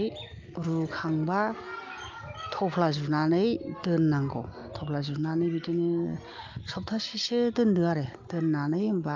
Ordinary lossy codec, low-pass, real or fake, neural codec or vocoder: Opus, 32 kbps; 7.2 kHz; real; none